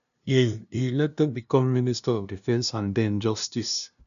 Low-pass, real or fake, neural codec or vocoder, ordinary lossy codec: 7.2 kHz; fake; codec, 16 kHz, 0.5 kbps, FunCodec, trained on LibriTTS, 25 frames a second; none